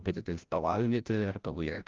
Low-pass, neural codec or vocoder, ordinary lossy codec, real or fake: 7.2 kHz; codec, 16 kHz, 0.5 kbps, FreqCodec, larger model; Opus, 32 kbps; fake